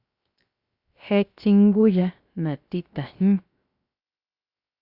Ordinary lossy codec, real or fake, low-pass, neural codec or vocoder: Opus, 64 kbps; fake; 5.4 kHz; codec, 16 kHz, 0.7 kbps, FocalCodec